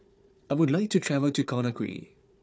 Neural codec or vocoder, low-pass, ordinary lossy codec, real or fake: codec, 16 kHz, 4 kbps, FunCodec, trained on Chinese and English, 50 frames a second; none; none; fake